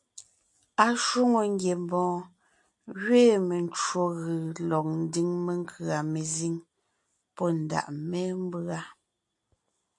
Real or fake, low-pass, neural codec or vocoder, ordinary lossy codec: real; 10.8 kHz; none; AAC, 64 kbps